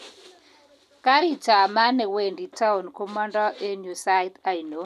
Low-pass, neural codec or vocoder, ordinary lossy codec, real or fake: 14.4 kHz; none; none; real